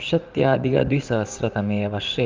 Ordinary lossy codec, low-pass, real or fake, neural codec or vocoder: Opus, 32 kbps; 7.2 kHz; fake; vocoder, 44.1 kHz, 80 mel bands, Vocos